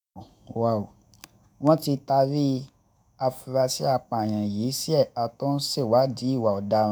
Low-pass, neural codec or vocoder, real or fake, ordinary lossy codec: none; autoencoder, 48 kHz, 128 numbers a frame, DAC-VAE, trained on Japanese speech; fake; none